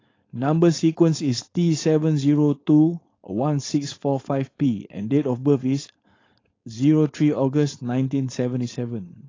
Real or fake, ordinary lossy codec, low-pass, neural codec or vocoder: fake; AAC, 32 kbps; 7.2 kHz; codec, 16 kHz, 4.8 kbps, FACodec